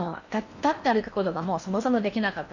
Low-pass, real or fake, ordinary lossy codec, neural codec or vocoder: 7.2 kHz; fake; none; codec, 16 kHz in and 24 kHz out, 0.8 kbps, FocalCodec, streaming, 65536 codes